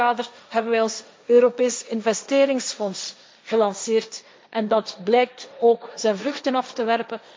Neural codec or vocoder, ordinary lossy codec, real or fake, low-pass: codec, 16 kHz, 1.1 kbps, Voila-Tokenizer; none; fake; none